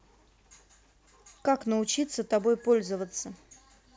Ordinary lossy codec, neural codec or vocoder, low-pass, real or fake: none; none; none; real